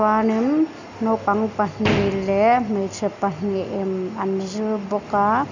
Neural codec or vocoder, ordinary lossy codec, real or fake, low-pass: none; none; real; 7.2 kHz